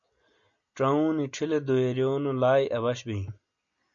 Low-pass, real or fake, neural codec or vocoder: 7.2 kHz; real; none